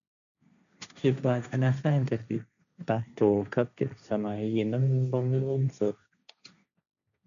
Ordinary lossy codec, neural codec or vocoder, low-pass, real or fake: none; codec, 16 kHz, 1.1 kbps, Voila-Tokenizer; 7.2 kHz; fake